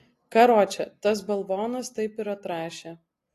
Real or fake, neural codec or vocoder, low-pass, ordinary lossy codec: real; none; 14.4 kHz; AAC, 48 kbps